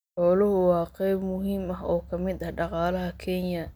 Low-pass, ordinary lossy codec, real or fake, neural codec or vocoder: none; none; real; none